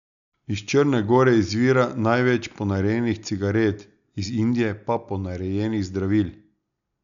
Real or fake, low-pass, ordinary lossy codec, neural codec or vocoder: real; 7.2 kHz; none; none